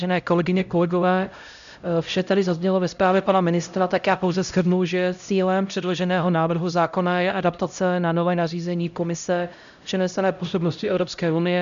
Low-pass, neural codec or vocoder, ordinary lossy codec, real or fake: 7.2 kHz; codec, 16 kHz, 0.5 kbps, X-Codec, HuBERT features, trained on LibriSpeech; MP3, 96 kbps; fake